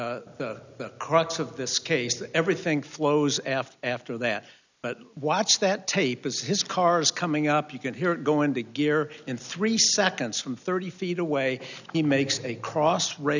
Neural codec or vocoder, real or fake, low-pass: none; real; 7.2 kHz